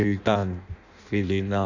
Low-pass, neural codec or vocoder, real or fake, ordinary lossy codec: 7.2 kHz; codec, 16 kHz in and 24 kHz out, 0.6 kbps, FireRedTTS-2 codec; fake; none